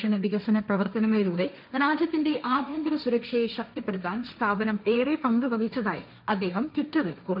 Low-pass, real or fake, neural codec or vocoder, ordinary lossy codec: 5.4 kHz; fake; codec, 16 kHz, 1.1 kbps, Voila-Tokenizer; none